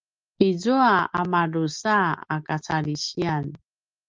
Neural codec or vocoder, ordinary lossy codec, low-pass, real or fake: none; Opus, 32 kbps; 7.2 kHz; real